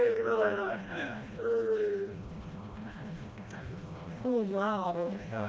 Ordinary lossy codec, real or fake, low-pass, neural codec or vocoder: none; fake; none; codec, 16 kHz, 1 kbps, FreqCodec, smaller model